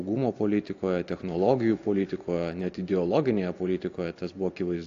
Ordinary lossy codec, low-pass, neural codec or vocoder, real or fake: AAC, 48 kbps; 7.2 kHz; none; real